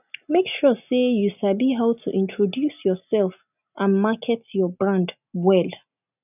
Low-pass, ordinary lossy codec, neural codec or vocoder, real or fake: 3.6 kHz; none; none; real